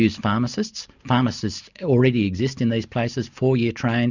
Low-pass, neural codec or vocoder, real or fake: 7.2 kHz; none; real